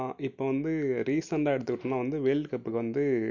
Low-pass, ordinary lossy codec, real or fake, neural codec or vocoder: 7.2 kHz; none; real; none